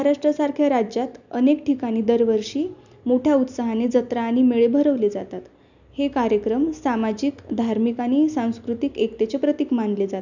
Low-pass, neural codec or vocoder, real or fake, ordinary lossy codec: 7.2 kHz; none; real; none